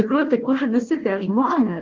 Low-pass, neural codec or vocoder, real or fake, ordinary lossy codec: 7.2 kHz; codec, 16 kHz, 1 kbps, FunCodec, trained on Chinese and English, 50 frames a second; fake; Opus, 16 kbps